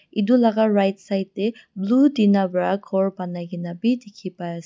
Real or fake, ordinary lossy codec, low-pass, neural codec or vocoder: real; none; none; none